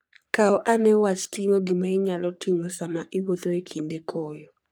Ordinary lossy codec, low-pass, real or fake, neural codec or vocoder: none; none; fake; codec, 44.1 kHz, 3.4 kbps, Pupu-Codec